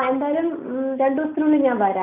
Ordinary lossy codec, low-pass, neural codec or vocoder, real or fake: none; 3.6 kHz; none; real